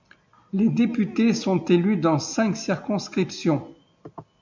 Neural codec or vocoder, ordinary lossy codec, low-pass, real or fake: none; MP3, 64 kbps; 7.2 kHz; real